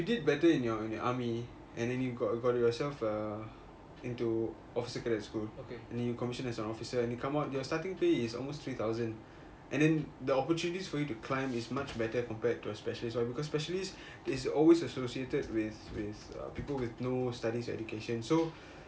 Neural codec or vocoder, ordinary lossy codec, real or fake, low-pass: none; none; real; none